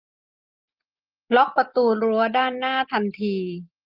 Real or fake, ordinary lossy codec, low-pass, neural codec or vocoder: real; Opus, 32 kbps; 5.4 kHz; none